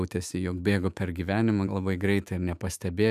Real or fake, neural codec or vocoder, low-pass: fake; autoencoder, 48 kHz, 128 numbers a frame, DAC-VAE, trained on Japanese speech; 14.4 kHz